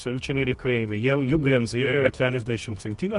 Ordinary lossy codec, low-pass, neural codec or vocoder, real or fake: MP3, 64 kbps; 10.8 kHz; codec, 24 kHz, 0.9 kbps, WavTokenizer, medium music audio release; fake